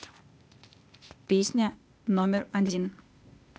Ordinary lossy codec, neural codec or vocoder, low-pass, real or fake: none; codec, 16 kHz, 0.8 kbps, ZipCodec; none; fake